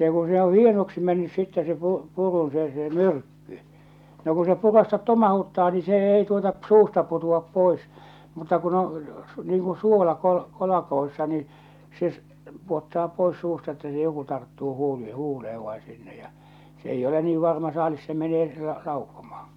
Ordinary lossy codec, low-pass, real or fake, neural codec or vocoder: none; 19.8 kHz; real; none